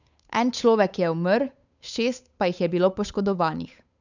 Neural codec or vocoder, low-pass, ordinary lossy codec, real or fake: codec, 16 kHz, 8 kbps, FunCodec, trained on Chinese and English, 25 frames a second; 7.2 kHz; none; fake